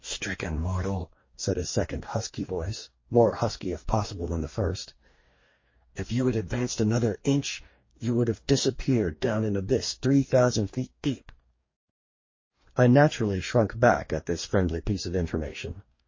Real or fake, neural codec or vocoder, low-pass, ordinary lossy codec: fake; codec, 44.1 kHz, 2.6 kbps, DAC; 7.2 kHz; MP3, 32 kbps